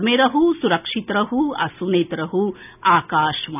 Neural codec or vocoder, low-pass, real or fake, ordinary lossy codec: none; 3.6 kHz; real; none